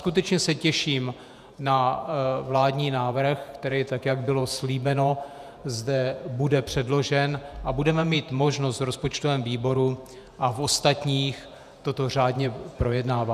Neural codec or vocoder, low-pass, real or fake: vocoder, 48 kHz, 128 mel bands, Vocos; 14.4 kHz; fake